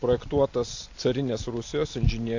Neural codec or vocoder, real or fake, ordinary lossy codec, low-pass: none; real; AAC, 48 kbps; 7.2 kHz